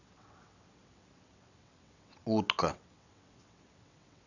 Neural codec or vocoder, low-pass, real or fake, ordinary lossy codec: vocoder, 22.05 kHz, 80 mel bands, WaveNeXt; 7.2 kHz; fake; none